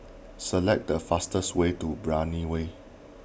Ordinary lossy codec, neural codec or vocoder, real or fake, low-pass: none; none; real; none